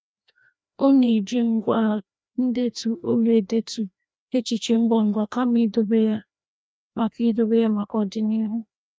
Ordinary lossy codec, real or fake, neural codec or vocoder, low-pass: none; fake; codec, 16 kHz, 1 kbps, FreqCodec, larger model; none